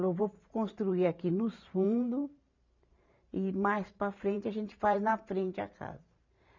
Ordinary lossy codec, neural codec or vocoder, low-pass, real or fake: none; vocoder, 44.1 kHz, 128 mel bands every 512 samples, BigVGAN v2; 7.2 kHz; fake